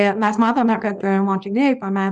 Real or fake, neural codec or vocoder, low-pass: fake; codec, 24 kHz, 0.9 kbps, WavTokenizer, small release; 10.8 kHz